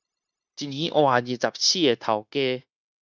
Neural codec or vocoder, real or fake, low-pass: codec, 16 kHz, 0.9 kbps, LongCat-Audio-Codec; fake; 7.2 kHz